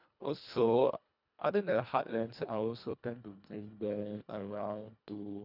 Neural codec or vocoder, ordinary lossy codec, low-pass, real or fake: codec, 24 kHz, 1.5 kbps, HILCodec; none; 5.4 kHz; fake